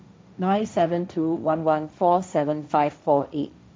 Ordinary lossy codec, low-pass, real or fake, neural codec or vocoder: none; none; fake; codec, 16 kHz, 1.1 kbps, Voila-Tokenizer